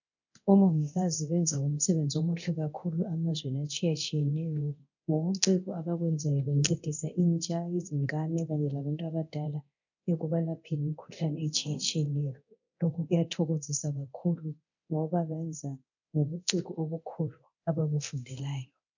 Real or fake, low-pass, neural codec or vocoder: fake; 7.2 kHz; codec, 24 kHz, 0.9 kbps, DualCodec